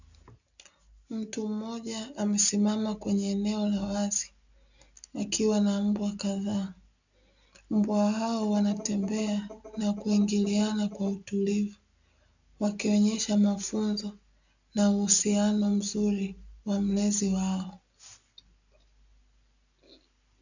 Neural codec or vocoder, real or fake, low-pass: none; real; 7.2 kHz